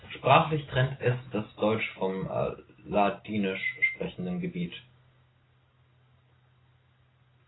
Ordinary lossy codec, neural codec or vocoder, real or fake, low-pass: AAC, 16 kbps; none; real; 7.2 kHz